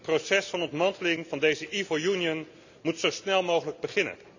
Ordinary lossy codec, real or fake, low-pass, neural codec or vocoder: none; real; 7.2 kHz; none